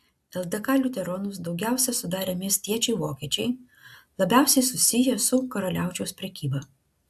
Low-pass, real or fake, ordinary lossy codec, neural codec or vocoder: 14.4 kHz; real; AAC, 96 kbps; none